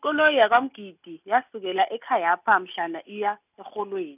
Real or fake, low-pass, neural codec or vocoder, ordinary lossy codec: real; 3.6 kHz; none; none